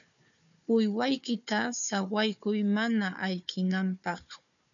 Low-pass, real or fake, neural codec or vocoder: 7.2 kHz; fake; codec, 16 kHz, 4 kbps, FunCodec, trained on Chinese and English, 50 frames a second